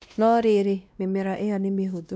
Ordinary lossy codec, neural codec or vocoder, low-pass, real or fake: none; codec, 16 kHz, 1 kbps, X-Codec, WavLM features, trained on Multilingual LibriSpeech; none; fake